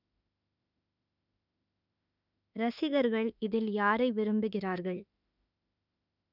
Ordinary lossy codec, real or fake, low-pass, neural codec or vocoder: none; fake; 5.4 kHz; autoencoder, 48 kHz, 32 numbers a frame, DAC-VAE, trained on Japanese speech